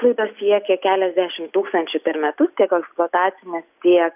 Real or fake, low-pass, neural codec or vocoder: real; 3.6 kHz; none